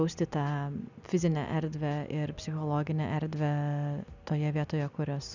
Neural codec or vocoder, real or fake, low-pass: none; real; 7.2 kHz